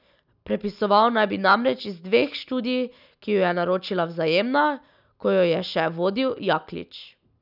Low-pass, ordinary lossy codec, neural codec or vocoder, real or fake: 5.4 kHz; none; none; real